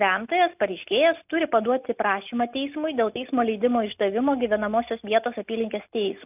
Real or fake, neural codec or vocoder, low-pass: real; none; 3.6 kHz